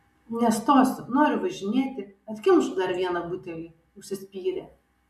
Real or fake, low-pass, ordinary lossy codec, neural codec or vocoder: real; 14.4 kHz; MP3, 64 kbps; none